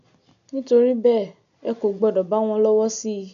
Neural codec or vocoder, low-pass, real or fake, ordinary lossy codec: none; 7.2 kHz; real; none